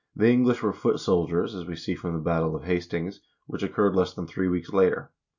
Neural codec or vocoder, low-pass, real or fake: none; 7.2 kHz; real